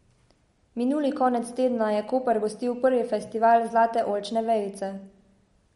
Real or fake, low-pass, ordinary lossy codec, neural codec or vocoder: real; 19.8 kHz; MP3, 48 kbps; none